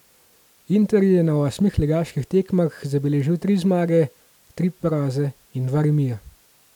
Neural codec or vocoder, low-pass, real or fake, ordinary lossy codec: none; 19.8 kHz; real; none